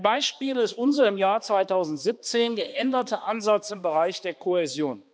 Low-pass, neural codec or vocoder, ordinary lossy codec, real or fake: none; codec, 16 kHz, 2 kbps, X-Codec, HuBERT features, trained on balanced general audio; none; fake